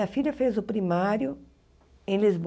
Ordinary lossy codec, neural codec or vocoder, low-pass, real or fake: none; none; none; real